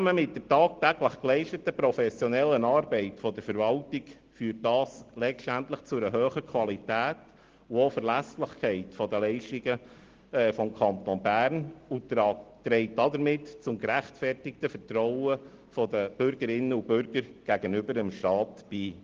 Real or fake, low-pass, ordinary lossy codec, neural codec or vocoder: real; 7.2 kHz; Opus, 16 kbps; none